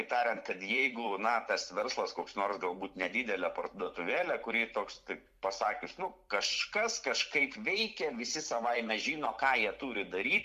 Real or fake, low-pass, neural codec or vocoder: fake; 14.4 kHz; vocoder, 44.1 kHz, 128 mel bands every 256 samples, BigVGAN v2